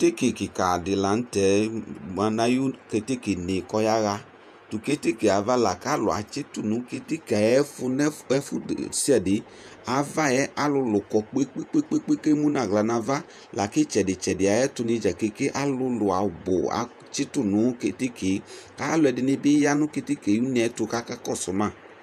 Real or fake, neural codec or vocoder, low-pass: fake; vocoder, 48 kHz, 128 mel bands, Vocos; 14.4 kHz